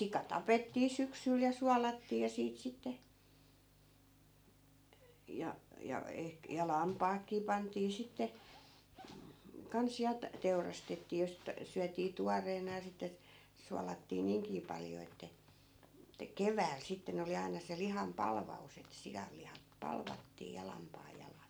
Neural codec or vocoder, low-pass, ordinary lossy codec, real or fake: none; none; none; real